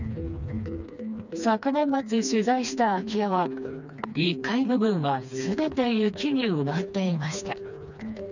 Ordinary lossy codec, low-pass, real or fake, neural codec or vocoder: none; 7.2 kHz; fake; codec, 16 kHz, 2 kbps, FreqCodec, smaller model